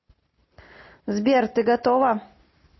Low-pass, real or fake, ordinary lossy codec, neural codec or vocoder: 7.2 kHz; real; MP3, 24 kbps; none